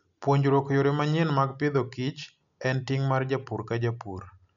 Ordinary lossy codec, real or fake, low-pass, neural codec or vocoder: MP3, 96 kbps; real; 7.2 kHz; none